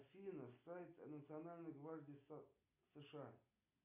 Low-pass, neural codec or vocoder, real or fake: 3.6 kHz; none; real